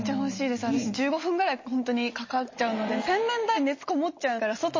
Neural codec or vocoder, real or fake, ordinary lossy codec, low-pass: none; real; MP3, 32 kbps; 7.2 kHz